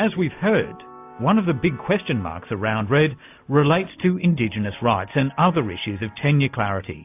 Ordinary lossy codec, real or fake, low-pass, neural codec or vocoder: AAC, 32 kbps; real; 3.6 kHz; none